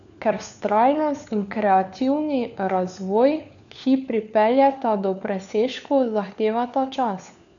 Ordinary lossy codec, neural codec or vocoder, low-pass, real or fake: none; codec, 16 kHz, 4 kbps, FunCodec, trained on LibriTTS, 50 frames a second; 7.2 kHz; fake